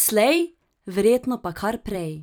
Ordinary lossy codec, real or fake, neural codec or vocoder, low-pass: none; fake; vocoder, 44.1 kHz, 128 mel bands every 256 samples, BigVGAN v2; none